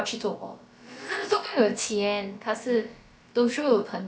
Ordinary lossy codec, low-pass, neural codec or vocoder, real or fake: none; none; codec, 16 kHz, about 1 kbps, DyCAST, with the encoder's durations; fake